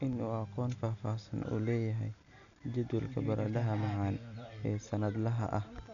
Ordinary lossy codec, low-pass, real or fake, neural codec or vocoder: none; 7.2 kHz; real; none